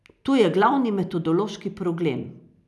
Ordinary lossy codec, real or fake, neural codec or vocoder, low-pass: none; real; none; none